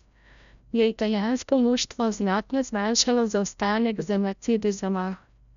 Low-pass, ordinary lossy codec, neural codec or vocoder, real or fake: 7.2 kHz; none; codec, 16 kHz, 0.5 kbps, FreqCodec, larger model; fake